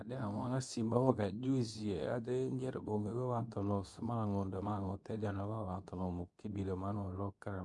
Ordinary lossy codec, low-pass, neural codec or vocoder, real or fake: none; 10.8 kHz; codec, 24 kHz, 0.9 kbps, WavTokenizer, medium speech release version 2; fake